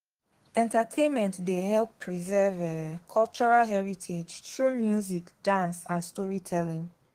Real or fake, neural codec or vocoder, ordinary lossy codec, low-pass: fake; codec, 32 kHz, 1.9 kbps, SNAC; Opus, 24 kbps; 14.4 kHz